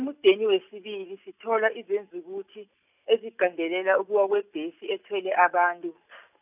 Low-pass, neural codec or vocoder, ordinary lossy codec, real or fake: 3.6 kHz; none; none; real